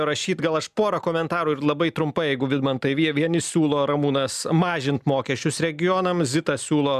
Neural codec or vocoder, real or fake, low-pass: none; real; 14.4 kHz